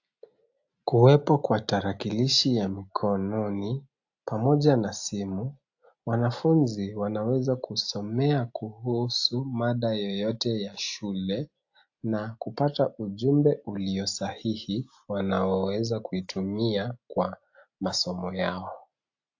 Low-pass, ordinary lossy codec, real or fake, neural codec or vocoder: 7.2 kHz; AAC, 48 kbps; real; none